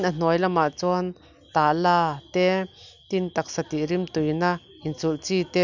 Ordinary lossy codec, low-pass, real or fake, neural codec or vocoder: none; 7.2 kHz; real; none